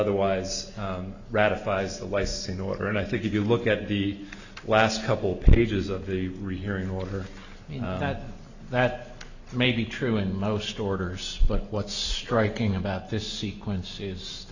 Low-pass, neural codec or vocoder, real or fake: 7.2 kHz; none; real